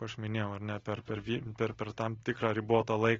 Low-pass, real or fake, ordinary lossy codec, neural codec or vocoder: 7.2 kHz; real; AAC, 24 kbps; none